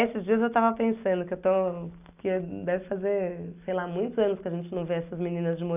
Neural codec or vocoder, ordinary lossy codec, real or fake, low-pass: none; none; real; 3.6 kHz